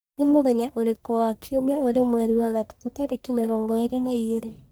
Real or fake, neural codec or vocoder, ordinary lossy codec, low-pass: fake; codec, 44.1 kHz, 1.7 kbps, Pupu-Codec; none; none